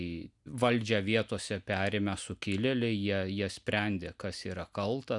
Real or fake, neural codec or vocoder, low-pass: real; none; 10.8 kHz